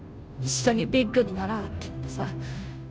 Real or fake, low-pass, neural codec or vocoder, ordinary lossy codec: fake; none; codec, 16 kHz, 0.5 kbps, FunCodec, trained on Chinese and English, 25 frames a second; none